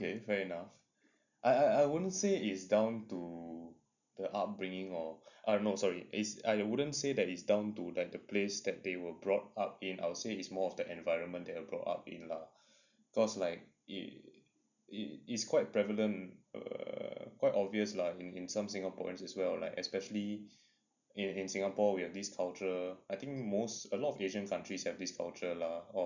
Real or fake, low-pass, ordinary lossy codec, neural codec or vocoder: real; 7.2 kHz; none; none